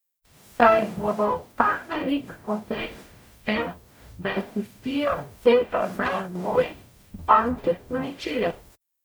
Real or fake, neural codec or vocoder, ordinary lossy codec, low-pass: fake; codec, 44.1 kHz, 0.9 kbps, DAC; none; none